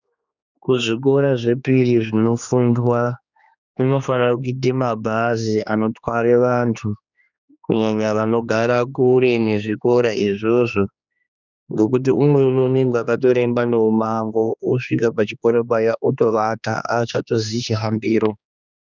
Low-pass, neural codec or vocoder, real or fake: 7.2 kHz; codec, 16 kHz, 2 kbps, X-Codec, HuBERT features, trained on general audio; fake